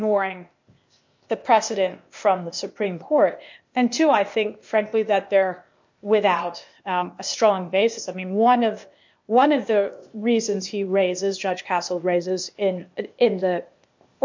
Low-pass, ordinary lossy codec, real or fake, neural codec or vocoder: 7.2 kHz; MP3, 48 kbps; fake; codec, 16 kHz, 0.8 kbps, ZipCodec